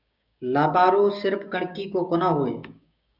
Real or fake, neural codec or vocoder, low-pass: fake; codec, 16 kHz, 6 kbps, DAC; 5.4 kHz